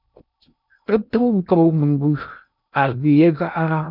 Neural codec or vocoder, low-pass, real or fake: codec, 16 kHz in and 24 kHz out, 0.6 kbps, FocalCodec, streaming, 2048 codes; 5.4 kHz; fake